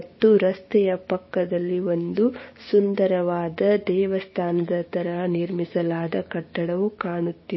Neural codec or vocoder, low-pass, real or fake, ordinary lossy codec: codec, 16 kHz, 6 kbps, DAC; 7.2 kHz; fake; MP3, 24 kbps